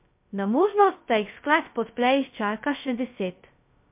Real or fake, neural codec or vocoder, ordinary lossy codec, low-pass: fake; codec, 16 kHz, 0.2 kbps, FocalCodec; MP3, 32 kbps; 3.6 kHz